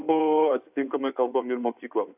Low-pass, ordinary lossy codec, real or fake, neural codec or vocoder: 3.6 kHz; AAC, 32 kbps; fake; codec, 16 kHz in and 24 kHz out, 2.2 kbps, FireRedTTS-2 codec